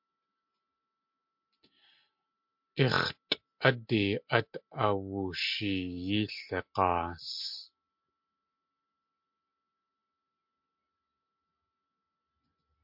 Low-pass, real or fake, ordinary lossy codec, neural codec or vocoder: 5.4 kHz; real; MP3, 48 kbps; none